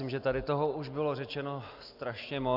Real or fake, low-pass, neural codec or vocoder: real; 5.4 kHz; none